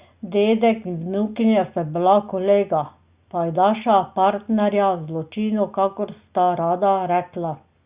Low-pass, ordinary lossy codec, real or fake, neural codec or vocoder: 3.6 kHz; Opus, 64 kbps; real; none